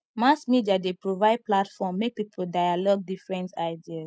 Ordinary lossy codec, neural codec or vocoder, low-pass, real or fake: none; none; none; real